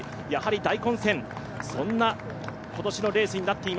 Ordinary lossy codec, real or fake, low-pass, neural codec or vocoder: none; real; none; none